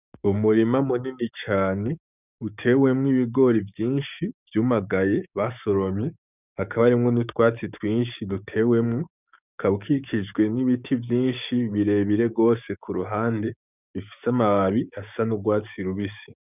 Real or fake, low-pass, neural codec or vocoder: fake; 3.6 kHz; codec, 16 kHz, 6 kbps, DAC